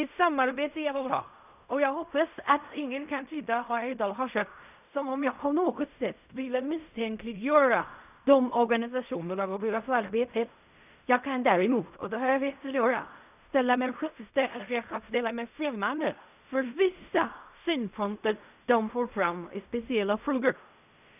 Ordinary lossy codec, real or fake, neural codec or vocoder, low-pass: none; fake; codec, 16 kHz in and 24 kHz out, 0.4 kbps, LongCat-Audio-Codec, fine tuned four codebook decoder; 3.6 kHz